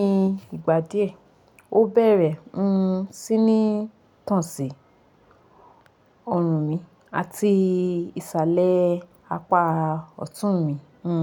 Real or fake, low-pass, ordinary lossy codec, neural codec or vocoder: real; 19.8 kHz; none; none